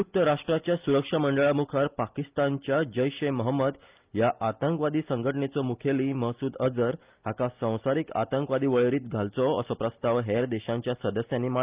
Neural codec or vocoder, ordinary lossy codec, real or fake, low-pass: none; Opus, 24 kbps; real; 3.6 kHz